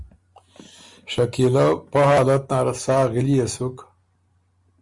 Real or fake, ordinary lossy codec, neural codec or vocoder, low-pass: real; Opus, 64 kbps; none; 10.8 kHz